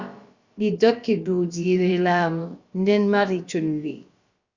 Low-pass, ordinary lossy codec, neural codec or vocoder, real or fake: 7.2 kHz; Opus, 64 kbps; codec, 16 kHz, about 1 kbps, DyCAST, with the encoder's durations; fake